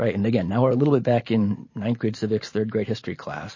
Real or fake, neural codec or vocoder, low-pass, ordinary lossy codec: fake; vocoder, 44.1 kHz, 128 mel bands every 512 samples, BigVGAN v2; 7.2 kHz; MP3, 32 kbps